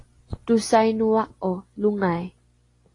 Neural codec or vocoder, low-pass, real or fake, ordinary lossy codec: none; 10.8 kHz; real; AAC, 32 kbps